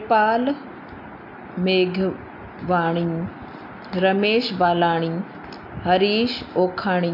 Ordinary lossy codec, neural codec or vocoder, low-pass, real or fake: MP3, 48 kbps; none; 5.4 kHz; real